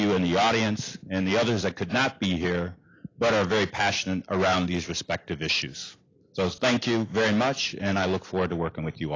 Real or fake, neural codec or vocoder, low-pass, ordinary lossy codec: real; none; 7.2 kHz; AAC, 32 kbps